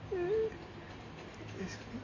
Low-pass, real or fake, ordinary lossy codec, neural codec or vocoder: 7.2 kHz; real; MP3, 32 kbps; none